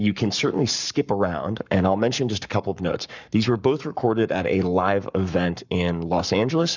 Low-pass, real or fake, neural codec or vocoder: 7.2 kHz; fake; codec, 44.1 kHz, 7.8 kbps, Pupu-Codec